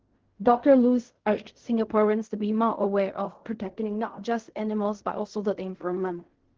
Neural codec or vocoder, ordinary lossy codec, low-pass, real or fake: codec, 16 kHz in and 24 kHz out, 0.4 kbps, LongCat-Audio-Codec, fine tuned four codebook decoder; Opus, 16 kbps; 7.2 kHz; fake